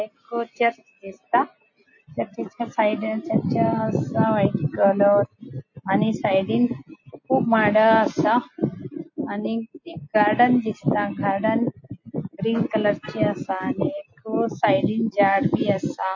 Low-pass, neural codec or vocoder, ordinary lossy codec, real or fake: 7.2 kHz; none; MP3, 32 kbps; real